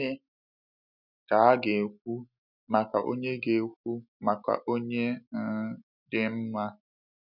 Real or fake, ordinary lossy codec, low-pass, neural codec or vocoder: real; none; 5.4 kHz; none